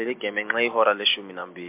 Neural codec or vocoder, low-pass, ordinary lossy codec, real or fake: none; 3.6 kHz; MP3, 32 kbps; real